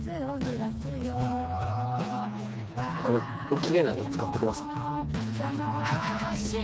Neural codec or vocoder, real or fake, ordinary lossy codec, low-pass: codec, 16 kHz, 2 kbps, FreqCodec, smaller model; fake; none; none